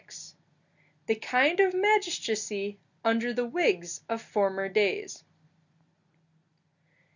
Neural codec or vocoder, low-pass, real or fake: none; 7.2 kHz; real